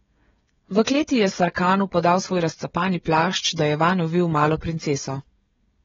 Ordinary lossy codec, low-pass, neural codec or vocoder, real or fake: AAC, 24 kbps; 7.2 kHz; codec, 16 kHz, 16 kbps, FreqCodec, smaller model; fake